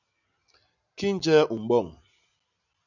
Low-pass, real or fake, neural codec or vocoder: 7.2 kHz; real; none